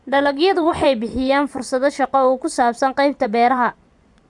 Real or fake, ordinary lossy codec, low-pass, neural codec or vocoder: fake; none; 10.8 kHz; vocoder, 24 kHz, 100 mel bands, Vocos